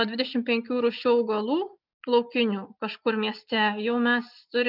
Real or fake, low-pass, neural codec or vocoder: real; 5.4 kHz; none